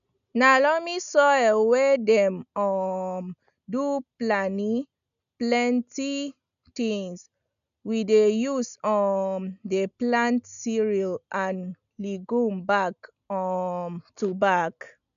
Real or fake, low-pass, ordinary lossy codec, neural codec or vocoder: real; 7.2 kHz; none; none